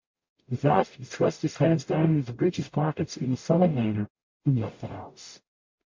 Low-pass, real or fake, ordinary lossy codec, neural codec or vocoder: 7.2 kHz; fake; MP3, 48 kbps; codec, 44.1 kHz, 0.9 kbps, DAC